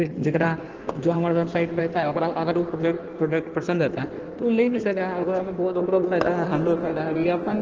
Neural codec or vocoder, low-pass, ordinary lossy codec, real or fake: codec, 16 kHz in and 24 kHz out, 1.1 kbps, FireRedTTS-2 codec; 7.2 kHz; Opus, 16 kbps; fake